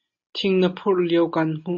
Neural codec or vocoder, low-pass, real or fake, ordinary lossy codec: none; 7.2 kHz; real; MP3, 32 kbps